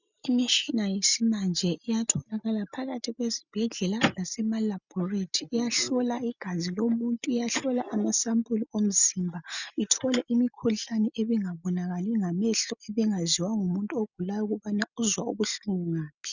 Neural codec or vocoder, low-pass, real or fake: none; 7.2 kHz; real